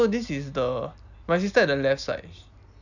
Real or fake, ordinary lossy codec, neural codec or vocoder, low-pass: real; none; none; 7.2 kHz